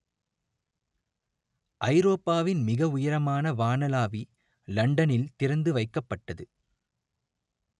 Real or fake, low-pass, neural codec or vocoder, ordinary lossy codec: real; 10.8 kHz; none; none